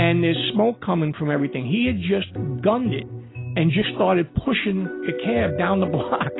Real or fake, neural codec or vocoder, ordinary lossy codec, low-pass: real; none; AAC, 16 kbps; 7.2 kHz